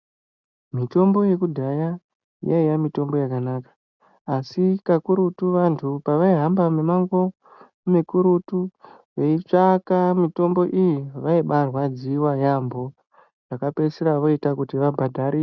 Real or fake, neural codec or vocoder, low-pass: real; none; 7.2 kHz